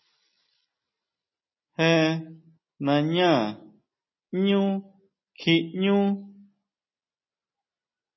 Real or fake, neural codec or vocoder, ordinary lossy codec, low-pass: real; none; MP3, 24 kbps; 7.2 kHz